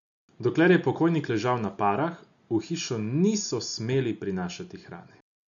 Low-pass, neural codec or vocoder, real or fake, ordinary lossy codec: 7.2 kHz; none; real; none